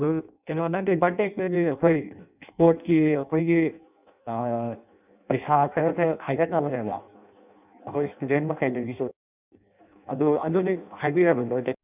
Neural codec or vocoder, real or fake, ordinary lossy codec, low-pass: codec, 16 kHz in and 24 kHz out, 0.6 kbps, FireRedTTS-2 codec; fake; none; 3.6 kHz